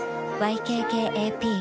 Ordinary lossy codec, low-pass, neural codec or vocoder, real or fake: none; none; none; real